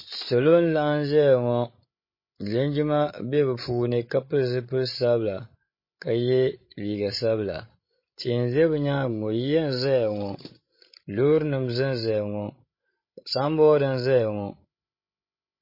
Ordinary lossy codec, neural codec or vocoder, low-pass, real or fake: MP3, 24 kbps; codec, 16 kHz, 16 kbps, FreqCodec, larger model; 5.4 kHz; fake